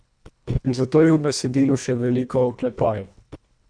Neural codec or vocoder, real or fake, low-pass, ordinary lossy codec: codec, 24 kHz, 1.5 kbps, HILCodec; fake; 9.9 kHz; none